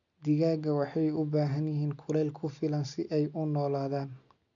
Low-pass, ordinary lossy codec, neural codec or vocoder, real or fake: 7.2 kHz; none; none; real